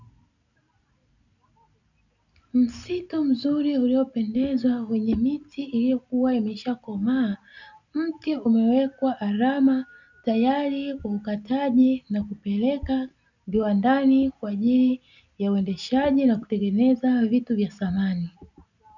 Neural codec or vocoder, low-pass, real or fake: none; 7.2 kHz; real